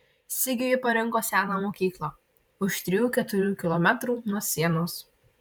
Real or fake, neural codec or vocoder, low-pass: fake; vocoder, 44.1 kHz, 128 mel bands every 512 samples, BigVGAN v2; 19.8 kHz